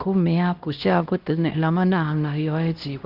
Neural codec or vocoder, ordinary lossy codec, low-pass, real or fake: codec, 16 kHz, 1 kbps, X-Codec, HuBERT features, trained on LibriSpeech; Opus, 16 kbps; 5.4 kHz; fake